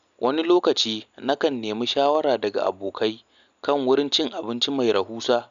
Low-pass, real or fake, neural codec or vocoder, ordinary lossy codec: 7.2 kHz; real; none; none